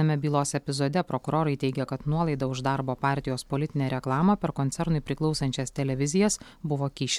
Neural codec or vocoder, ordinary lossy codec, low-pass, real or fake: none; MP3, 96 kbps; 19.8 kHz; real